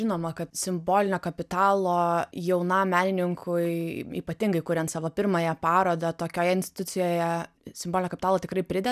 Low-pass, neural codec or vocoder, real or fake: 14.4 kHz; none; real